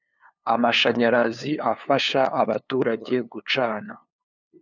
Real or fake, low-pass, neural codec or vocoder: fake; 7.2 kHz; codec, 16 kHz, 2 kbps, FunCodec, trained on LibriTTS, 25 frames a second